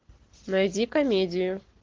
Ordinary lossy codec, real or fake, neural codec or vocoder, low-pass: Opus, 16 kbps; real; none; 7.2 kHz